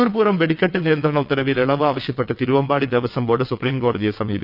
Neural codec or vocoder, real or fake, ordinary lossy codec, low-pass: vocoder, 22.05 kHz, 80 mel bands, WaveNeXt; fake; none; 5.4 kHz